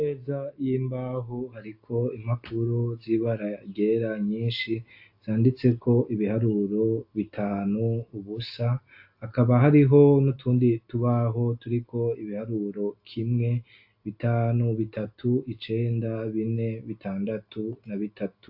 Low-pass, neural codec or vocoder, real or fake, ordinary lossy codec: 5.4 kHz; none; real; AAC, 48 kbps